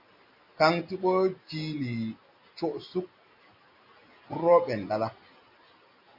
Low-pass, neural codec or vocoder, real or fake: 5.4 kHz; none; real